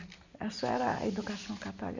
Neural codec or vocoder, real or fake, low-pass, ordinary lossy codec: none; real; 7.2 kHz; none